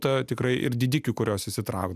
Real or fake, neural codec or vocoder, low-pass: real; none; 14.4 kHz